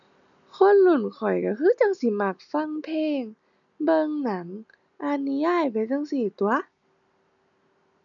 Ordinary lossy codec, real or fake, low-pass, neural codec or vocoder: none; real; 7.2 kHz; none